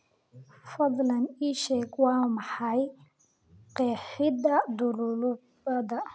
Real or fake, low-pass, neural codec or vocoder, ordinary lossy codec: real; none; none; none